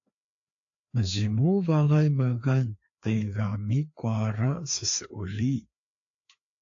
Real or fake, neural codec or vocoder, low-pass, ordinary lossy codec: fake; codec, 16 kHz, 2 kbps, FreqCodec, larger model; 7.2 kHz; AAC, 48 kbps